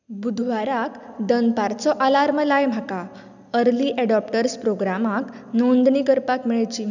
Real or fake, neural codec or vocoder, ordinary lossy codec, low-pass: real; none; none; 7.2 kHz